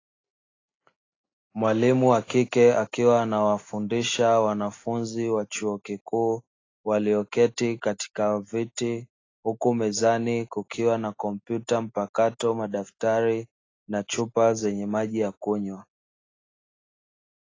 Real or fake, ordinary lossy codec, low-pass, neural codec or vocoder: real; AAC, 32 kbps; 7.2 kHz; none